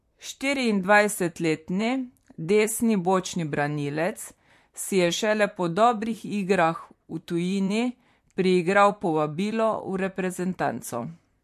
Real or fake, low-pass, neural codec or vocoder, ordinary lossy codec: fake; 14.4 kHz; vocoder, 44.1 kHz, 128 mel bands, Pupu-Vocoder; MP3, 64 kbps